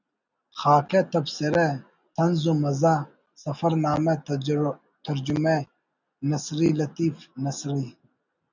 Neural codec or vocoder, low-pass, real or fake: none; 7.2 kHz; real